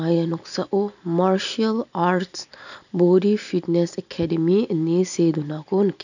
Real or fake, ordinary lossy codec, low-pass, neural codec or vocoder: real; none; 7.2 kHz; none